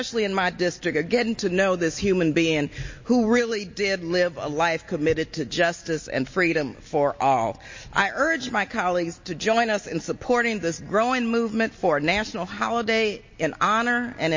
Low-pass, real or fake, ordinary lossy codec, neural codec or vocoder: 7.2 kHz; real; MP3, 32 kbps; none